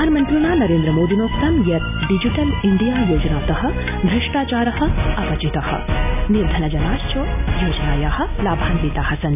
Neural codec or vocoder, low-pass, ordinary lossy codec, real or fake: none; 3.6 kHz; none; real